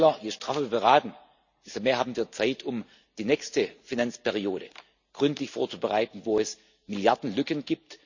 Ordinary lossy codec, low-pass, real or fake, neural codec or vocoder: none; 7.2 kHz; real; none